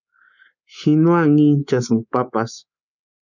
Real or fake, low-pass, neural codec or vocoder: fake; 7.2 kHz; codec, 24 kHz, 3.1 kbps, DualCodec